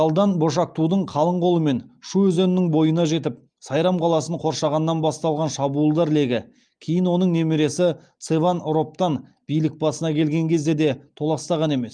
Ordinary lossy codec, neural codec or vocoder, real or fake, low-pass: Opus, 32 kbps; none; real; 9.9 kHz